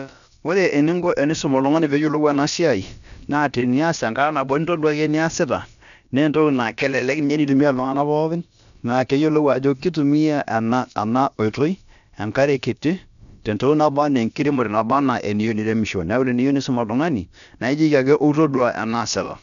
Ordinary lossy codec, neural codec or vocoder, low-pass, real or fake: none; codec, 16 kHz, about 1 kbps, DyCAST, with the encoder's durations; 7.2 kHz; fake